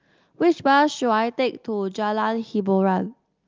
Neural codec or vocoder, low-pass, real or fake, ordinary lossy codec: none; 7.2 kHz; real; Opus, 24 kbps